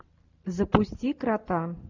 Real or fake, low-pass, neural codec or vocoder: real; 7.2 kHz; none